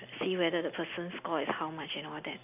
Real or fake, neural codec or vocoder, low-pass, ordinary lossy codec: real; none; 3.6 kHz; none